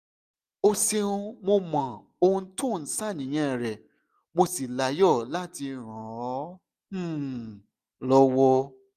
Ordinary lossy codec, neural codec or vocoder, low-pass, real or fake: none; none; 14.4 kHz; real